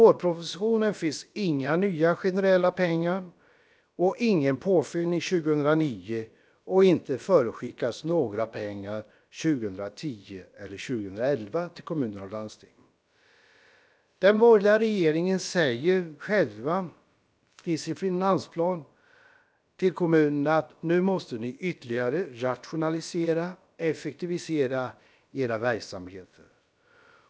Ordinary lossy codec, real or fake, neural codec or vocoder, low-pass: none; fake; codec, 16 kHz, about 1 kbps, DyCAST, with the encoder's durations; none